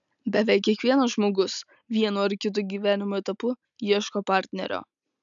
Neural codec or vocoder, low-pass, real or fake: none; 7.2 kHz; real